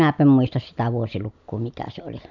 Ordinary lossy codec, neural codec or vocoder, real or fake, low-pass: none; none; real; 7.2 kHz